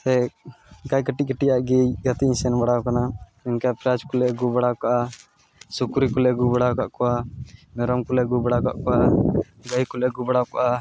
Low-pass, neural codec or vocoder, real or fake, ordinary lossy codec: none; none; real; none